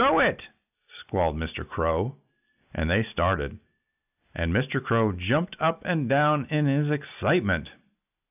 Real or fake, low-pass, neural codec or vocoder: real; 3.6 kHz; none